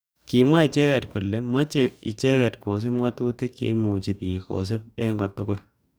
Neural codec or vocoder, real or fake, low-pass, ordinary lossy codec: codec, 44.1 kHz, 2.6 kbps, DAC; fake; none; none